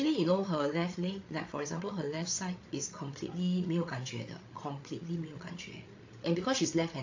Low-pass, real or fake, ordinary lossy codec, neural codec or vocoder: 7.2 kHz; fake; AAC, 48 kbps; codec, 16 kHz, 8 kbps, FreqCodec, larger model